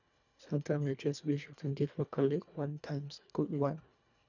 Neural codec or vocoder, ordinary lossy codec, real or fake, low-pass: codec, 24 kHz, 1.5 kbps, HILCodec; none; fake; 7.2 kHz